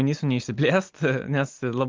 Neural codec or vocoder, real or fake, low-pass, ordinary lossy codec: none; real; 7.2 kHz; Opus, 32 kbps